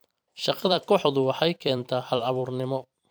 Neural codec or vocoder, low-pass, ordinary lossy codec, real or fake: vocoder, 44.1 kHz, 128 mel bands every 256 samples, BigVGAN v2; none; none; fake